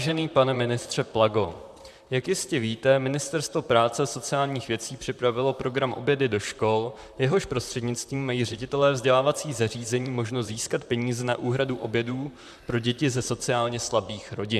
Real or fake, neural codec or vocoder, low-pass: fake; vocoder, 44.1 kHz, 128 mel bands, Pupu-Vocoder; 14.4 kHz